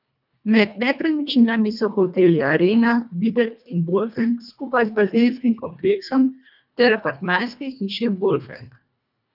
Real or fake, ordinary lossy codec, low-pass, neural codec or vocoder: fake; none; 5.4 kHz; codec, 24 kHz, 1.5 kbps, HILCodec